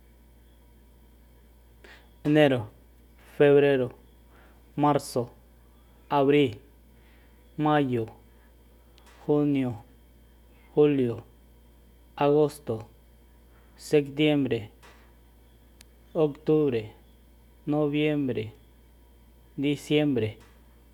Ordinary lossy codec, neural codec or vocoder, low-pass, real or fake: none; none; 19.8 kHz; real